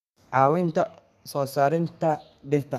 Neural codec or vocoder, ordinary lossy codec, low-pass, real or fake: codec, 32 kHz, 1.9 kbps, SNAC; none; 14.4 kHz; fake